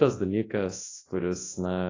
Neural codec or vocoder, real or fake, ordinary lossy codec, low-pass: codec, 24 kHz, 0.9 kbps, WavTokenizer, large speech release; fake; AAC, 32 kbps; 7.2 kHz